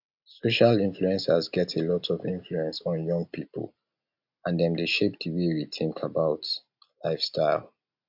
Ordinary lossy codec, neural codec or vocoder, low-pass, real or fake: AAC, 48 kbps; none; 5.4 kHz; real